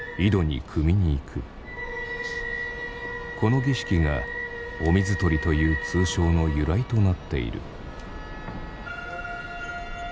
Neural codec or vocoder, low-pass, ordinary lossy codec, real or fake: none; none; none; real